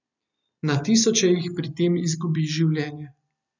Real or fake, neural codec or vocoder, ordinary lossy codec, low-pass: real; none; none; 7.2 kHz